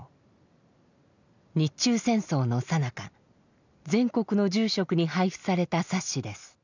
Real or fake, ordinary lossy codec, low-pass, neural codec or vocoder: real; none; 7.2 kHz; none